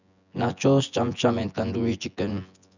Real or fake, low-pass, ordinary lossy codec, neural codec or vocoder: fake; 7.2 kHz; none; vocoder, 24 kHz, 100 mel bands, Vocos